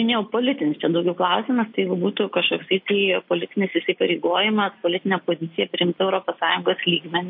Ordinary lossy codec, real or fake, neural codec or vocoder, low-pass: MP3, 32 kbps; real; none; 5.4 kHz